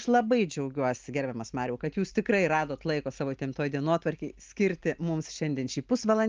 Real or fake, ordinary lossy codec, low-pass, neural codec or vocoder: real; Opus, 24 kbps; 7.2 kHz; none